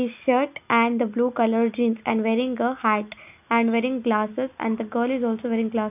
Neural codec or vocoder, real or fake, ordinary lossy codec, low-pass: none; real; none; 3.6 kHz